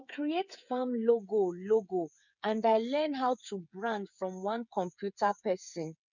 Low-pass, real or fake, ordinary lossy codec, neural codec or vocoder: 7.2 kHz; fake; none; codec, 16 kHz, 8 kbps, FreqCodec, smaller model